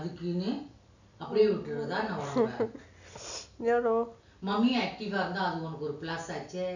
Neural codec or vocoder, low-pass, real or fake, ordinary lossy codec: none; 7.2 kHz; real; none